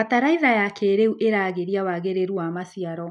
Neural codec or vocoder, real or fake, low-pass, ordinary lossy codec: none; real; 10.8 kHz; none